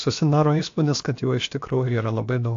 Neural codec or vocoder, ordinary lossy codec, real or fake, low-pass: codec, 16 kHz, about 1 kbps, DyCAST, with the encoder's durations; AAC, 48 kbps; fake; 7.2 kHz